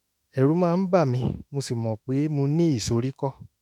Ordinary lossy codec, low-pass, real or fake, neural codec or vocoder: none; 19.8 kHz; fake; autoencoder, 48 kHz, 32 numbers a frame, DAC-VAE, trained on Japanese speech